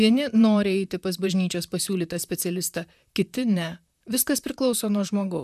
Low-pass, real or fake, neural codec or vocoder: 14.4 kHz; fake; vocoder, 44.1 kHz, 128 mel bands, Pupu-Vocoder